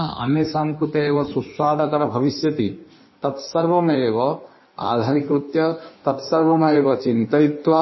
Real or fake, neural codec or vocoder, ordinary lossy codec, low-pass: fake; codec, 16 kHz in and 24 kHz out, 1.1 kbps, FireRedTTS-2 codec; MP3, 24 kbps; 7.2 kHz